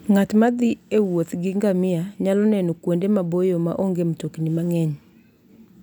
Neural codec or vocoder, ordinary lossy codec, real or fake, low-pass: none; none; real; 19.8 kHz